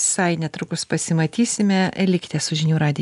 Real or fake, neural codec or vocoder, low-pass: real; none; 10.8 kHz